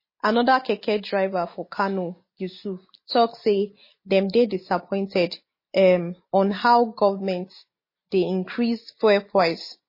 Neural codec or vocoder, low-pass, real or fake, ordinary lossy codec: none; 5.4 kHz; real; MP3, 24 kbps